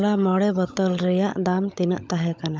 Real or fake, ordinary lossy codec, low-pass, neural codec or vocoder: fake; none; none; codec, 16 kHz, 16 kbps, FunCodec, trained on LibriTTS, 50 frames a second